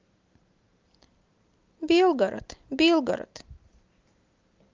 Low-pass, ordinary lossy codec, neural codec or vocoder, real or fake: 7.2 kHz; Opus, 32 kbps; none; real